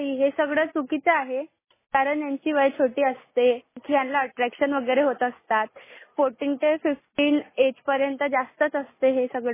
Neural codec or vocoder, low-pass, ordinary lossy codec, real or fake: none; 3.6 kHz; MP3, 16 kbps; real